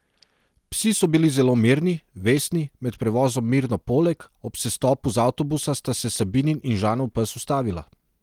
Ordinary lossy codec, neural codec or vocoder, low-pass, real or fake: Opus, 24 kbps; none; 19.8 kHz; real